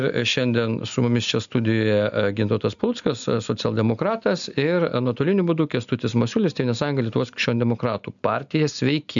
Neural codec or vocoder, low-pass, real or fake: none; 7.2 kHz; real